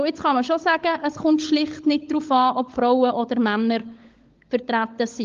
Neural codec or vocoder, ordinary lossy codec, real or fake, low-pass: codec, 16 kHz, 16 kbps, FunCodec, trained on LibriTTS, 50 frames a second; Opus, 32 kbps; fake; 7.2 kHz